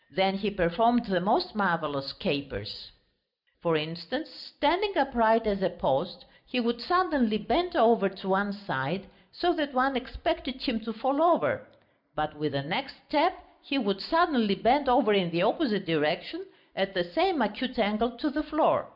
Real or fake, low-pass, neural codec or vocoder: real; 5.4 kHz; none